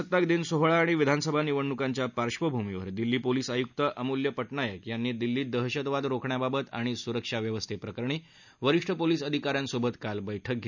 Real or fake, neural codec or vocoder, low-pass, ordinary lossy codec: real; none; 7.2 kHz; none